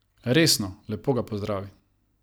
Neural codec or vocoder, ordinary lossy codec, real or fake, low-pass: none; none; real; none